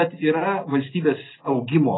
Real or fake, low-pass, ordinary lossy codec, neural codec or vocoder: real; 7.2 kHz; AAC, 16 kbps; none